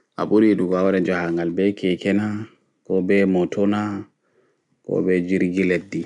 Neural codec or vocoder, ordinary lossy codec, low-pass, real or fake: none; none; 10.8 kHz; real